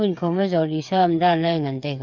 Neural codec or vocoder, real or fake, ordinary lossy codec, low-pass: codec, 16 kHz, 8 kbps, FreqCodec, smaller model; fake; none; 7.2 kHz